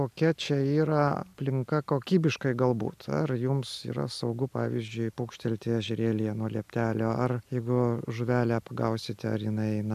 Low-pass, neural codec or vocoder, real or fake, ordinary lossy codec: 14.4 kHz; none; real; AAC, 96 kbps